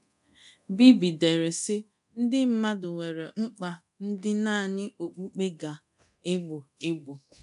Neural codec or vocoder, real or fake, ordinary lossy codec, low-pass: codec, 24 kHz, 0.9 kbps, DualCodec; fake; none; 10.8 kHz